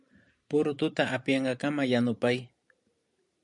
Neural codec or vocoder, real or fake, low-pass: vocoder, 44.1 kHz, 128 mel bands every 512 samples, BigVGAN v2; fake; 10.8 kHz